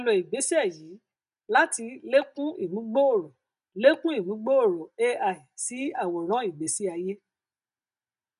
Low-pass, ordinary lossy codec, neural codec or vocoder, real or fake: 10.8 kHz; none; none; real